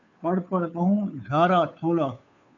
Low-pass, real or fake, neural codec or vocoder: 7.2 kHz; fake; codec, 16 kHz, 2 kbps, FunCodec, trained on Chinese and English, 25 frames a second